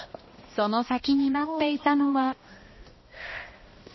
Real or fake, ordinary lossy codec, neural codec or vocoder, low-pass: fake; MP3, 24 kbps; codec, 16 kHz, 1 kbps, X-Codec, HuBERT features, trained on balanced general audio; 7.2 kHz